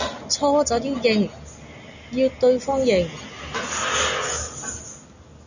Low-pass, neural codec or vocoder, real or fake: 7.2 kHz; none; real